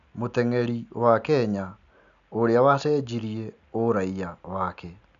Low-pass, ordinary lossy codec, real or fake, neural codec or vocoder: 7.2 kHz; none; real; none